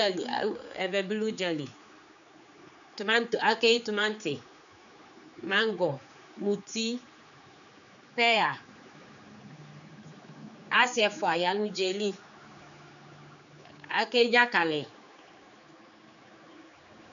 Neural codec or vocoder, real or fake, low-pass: codec, 16 kHz, 4 kbps, X-Codec, HuBERT features, trained on general audio; fake; 7.2 kHz